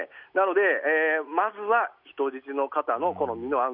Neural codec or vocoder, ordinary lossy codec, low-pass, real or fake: none; none; 5.4 kHz; real